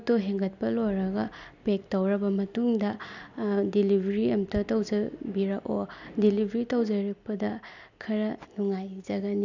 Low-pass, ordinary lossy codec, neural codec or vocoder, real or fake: 7.2 kHz; none; none; real